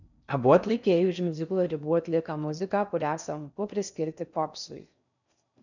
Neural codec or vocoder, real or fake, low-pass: codec, 16 kHz in and 24 kHz out, 0.6 kbps, FocalCodec, streaming, 4096 codes; fake; 7.2 kHz